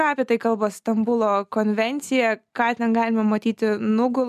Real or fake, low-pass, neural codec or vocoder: real; 14.4 kHz; none